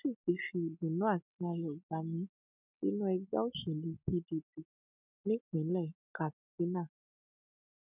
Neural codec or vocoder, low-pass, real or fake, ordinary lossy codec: none; 3.6 kHz; real; none